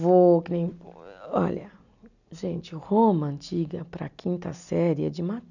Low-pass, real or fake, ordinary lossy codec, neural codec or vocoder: 7.2 kHz; real; none; none